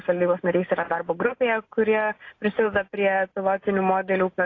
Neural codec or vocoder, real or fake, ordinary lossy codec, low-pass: none; real; AAC, 32 kbps; 7.2 kHz